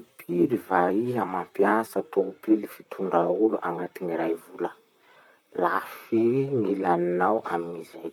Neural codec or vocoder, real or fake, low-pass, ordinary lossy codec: vocoder, 44.1 kHz, 128 mel bands, Pupu-Vocoder; fake; 19.8 kHz; none